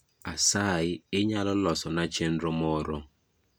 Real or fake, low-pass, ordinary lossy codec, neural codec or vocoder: real; none; none; none